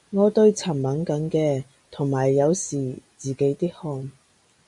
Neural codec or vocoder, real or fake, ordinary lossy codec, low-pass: none; real; MP3, 64 kbps; 10.8 kHz